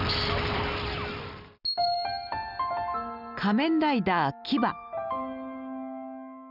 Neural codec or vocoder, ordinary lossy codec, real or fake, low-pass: none; none; real; 5.4 kHz